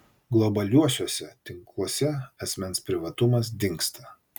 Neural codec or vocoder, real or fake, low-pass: none; real; 19.8 kHz